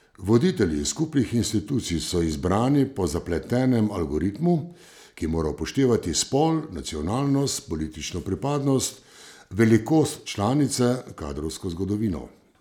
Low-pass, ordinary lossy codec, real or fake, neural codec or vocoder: 19.8 kHz; none; real; none